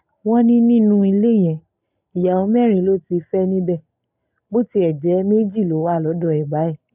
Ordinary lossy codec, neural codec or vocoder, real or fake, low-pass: none; none; real; 3.6 kHz